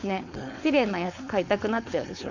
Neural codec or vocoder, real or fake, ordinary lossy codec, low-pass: codec, 16 kHz, 4.8 kbps, FACodec; fake; none; 7.2 kHz